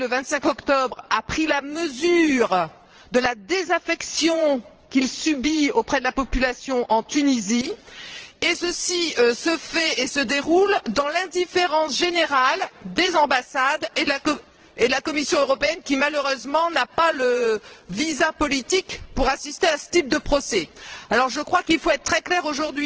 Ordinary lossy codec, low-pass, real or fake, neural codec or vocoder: Opus, 16 kbps; 7.2 kHz; fake; vocoder, 22.05 kHz, 80 mel bands, Vocos